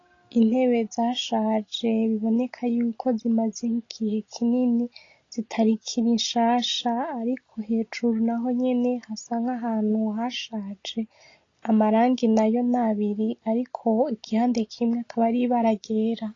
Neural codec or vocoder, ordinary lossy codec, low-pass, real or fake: none; AAC, 32 kbps; 7.2 kHz; real